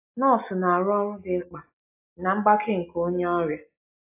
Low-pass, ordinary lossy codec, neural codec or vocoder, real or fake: 3.6 kHz; AAC, 24 kbps; none; real